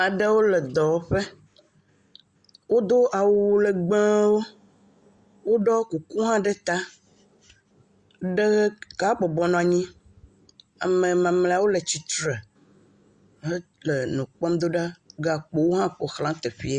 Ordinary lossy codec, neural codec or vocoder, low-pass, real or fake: Opus, 64 kbps; none; 10.8 kHz; real